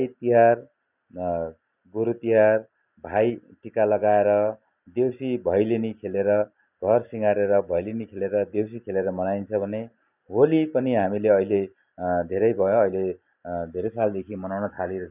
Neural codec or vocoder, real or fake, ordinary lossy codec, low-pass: none; real; none; 3.6 kHz